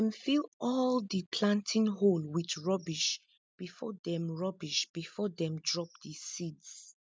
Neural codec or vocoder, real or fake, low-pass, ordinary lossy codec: none; real; none; none